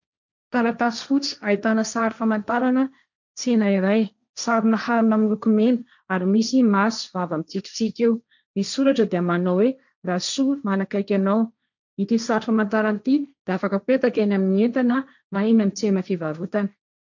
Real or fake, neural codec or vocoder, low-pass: fake; codec, 16 kHz, 1.1 kbps, Voila-Tokenizer; 7.2 kHz